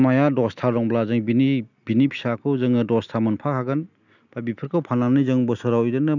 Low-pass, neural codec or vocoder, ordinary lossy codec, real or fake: 7.2 kHz; none; none; real